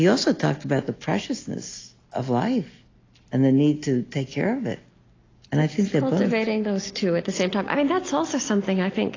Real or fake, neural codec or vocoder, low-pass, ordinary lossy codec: real; none; 7.2 kHz; AAC, 32 kbps